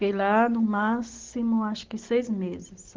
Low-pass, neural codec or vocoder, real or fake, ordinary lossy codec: 7.2 kHz; none; real; Opus, 16 kbps